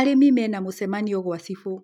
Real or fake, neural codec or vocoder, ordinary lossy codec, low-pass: real; none; none; 19.8 kHz